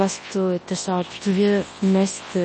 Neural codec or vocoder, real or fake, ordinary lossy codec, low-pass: codec, 24 kHz, 0.9 kbps, WavTokenizer, large speech release; fake; MP3, 32 kbps; 10.8 kHz